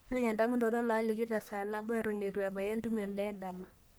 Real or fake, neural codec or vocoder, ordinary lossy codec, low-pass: fake; codec, 44.1 kHz, 1.7 kbps, Pupu-Codec; none; none